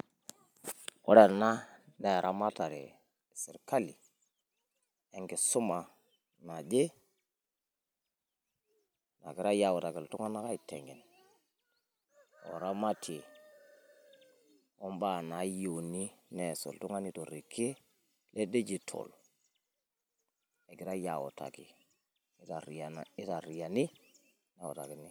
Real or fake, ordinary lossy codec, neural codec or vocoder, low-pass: real; none; none; none